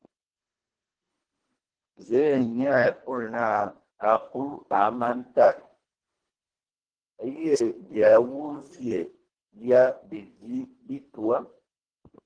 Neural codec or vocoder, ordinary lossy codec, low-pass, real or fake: codec, 24 kHz, 1.5 kbps, HILCodec; Opus, 16 kbps; 9.9 kHz; fake